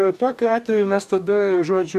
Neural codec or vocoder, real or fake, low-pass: codec, 44.1 kHz, 2.6 kbps, DAC; fake; 14.4 kHz